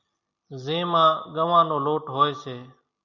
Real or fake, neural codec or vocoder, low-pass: real; none; 7.2 kHz